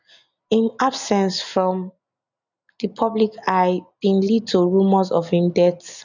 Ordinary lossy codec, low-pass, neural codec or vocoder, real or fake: none; 7.2 kHz; none; real